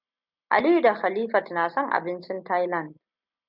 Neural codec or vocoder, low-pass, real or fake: none; 5.4 kHz; real